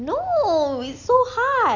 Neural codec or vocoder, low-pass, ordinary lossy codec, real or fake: none; 7.2 kHz; none; real